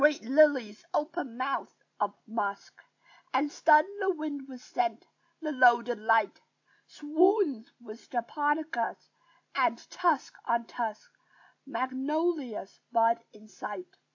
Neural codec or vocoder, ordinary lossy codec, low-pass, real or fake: none; AAC, 48 kbps; 7.2 kHz; real